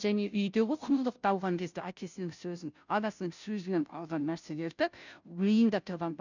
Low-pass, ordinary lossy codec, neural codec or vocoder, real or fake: 7.2 kHz; none; codec, 16 kHz, 0.5 kbps, FunCodec, trained on Chinese and English, 25 frames a second; fake